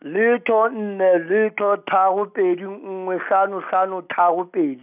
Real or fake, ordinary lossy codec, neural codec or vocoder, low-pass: real; none; none; 3.6 kHz